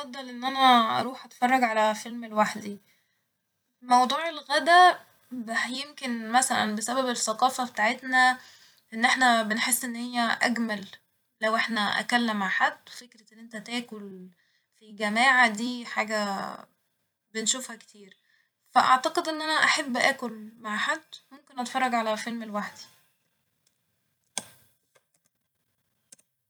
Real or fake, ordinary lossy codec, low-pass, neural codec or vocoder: fake; none; none; vocoder, 44.1 kHz, 128 mel bands every 256 samples, BigVGAN v2